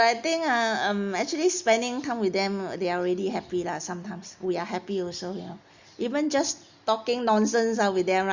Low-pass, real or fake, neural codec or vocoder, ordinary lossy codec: 7.2 kHz; real; none; Opus, 64 kbps